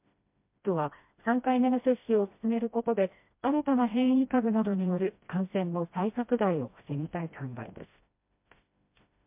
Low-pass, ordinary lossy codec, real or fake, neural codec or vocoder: 3.6 kHz; MP3, 32 kbps; fake; codec, 16 kHz, 1 kbps, FreqCodec, smaller model